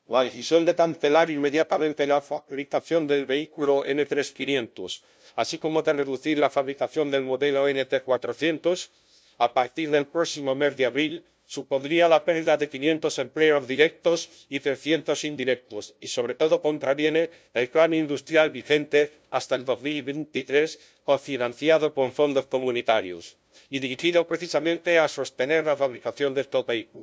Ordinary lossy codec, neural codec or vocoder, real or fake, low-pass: none; codec, 16 kHz, 0.5 kbps, FunCodec, trained on LibriTTS, 25 frames a second; fake; none